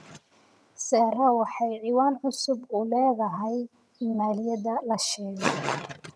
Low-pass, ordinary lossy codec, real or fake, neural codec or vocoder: none; none; fake; vocoder, 22.05 kHz, 80 mel bands, HiFi-GAN